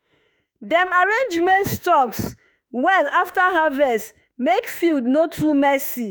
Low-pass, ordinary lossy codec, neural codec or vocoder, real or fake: none; none; autoencoder, 48 kHz, 32 numbers a frame, DAC-VAE, trained on Japanese speech; fake